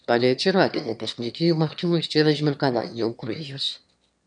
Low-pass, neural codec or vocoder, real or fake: 9.9 kHz; autoencoder, 22.05 kHz, a latent of 192 numbers a frame, VITS, trained on one speaker; fake